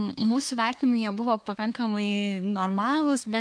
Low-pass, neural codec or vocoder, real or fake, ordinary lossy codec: 9.9 kHz; codec, 24 kHz, 1 kbps, SNAC; fake; MP3, 64 kbps